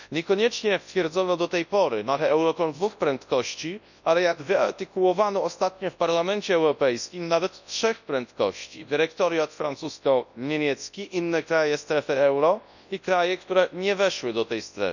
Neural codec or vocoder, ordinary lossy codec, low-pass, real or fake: codec, 24 kHz, 0.9 kbps, WavTokenizer, large speech release; none; 7.2 kHz; fake